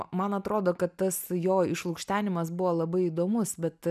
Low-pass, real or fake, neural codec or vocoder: 14.4 kHz; real; none